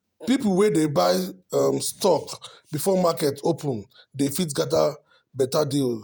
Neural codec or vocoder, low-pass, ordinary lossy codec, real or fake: vocoder, 48 kHz, 128 mel bands, Vocos; none; none; fake